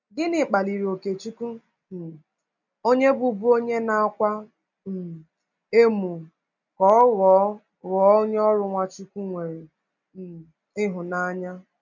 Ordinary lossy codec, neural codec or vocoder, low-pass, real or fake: none; none; 7.2 kHz; real